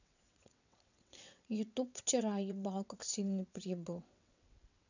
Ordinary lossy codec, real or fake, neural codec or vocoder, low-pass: none; fake; vocoder, 22.05 kHz, 80 mel bands, WaveNeXt; 7.2 kHz